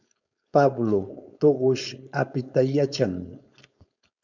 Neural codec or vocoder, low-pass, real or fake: codec, 16 kHz, 4.8 kbps, FACodec; 7.2 kHz; fake